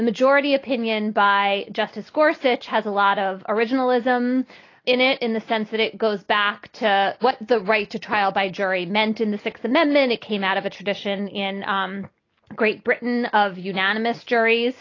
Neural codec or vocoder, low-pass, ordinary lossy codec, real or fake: none; 7.2 kHz; AAC, 32 kbps; real